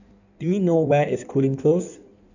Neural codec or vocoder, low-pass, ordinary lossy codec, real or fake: codec, 16 kHz in and 24 kHz out, 1.1 kbps, FireRedTTS-2 codec; 7.2 kHz; none; fake